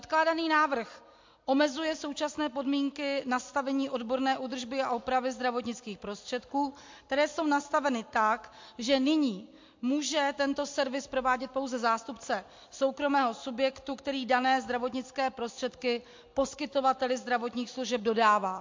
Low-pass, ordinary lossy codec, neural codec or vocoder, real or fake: 7.2 kHz; MP3, 48 kbps; none; real